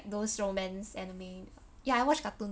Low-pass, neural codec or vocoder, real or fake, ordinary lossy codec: none; none; real; none